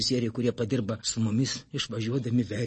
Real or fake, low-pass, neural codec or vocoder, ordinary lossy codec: real; 10.8 kHz; none; MP3, 32 kbps